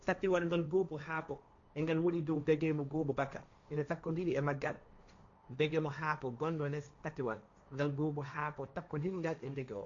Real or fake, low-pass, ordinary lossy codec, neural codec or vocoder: fake; 7.2 kHz; none; codec, 16 kHz, 1.1 kbps, Voila-Tokenizer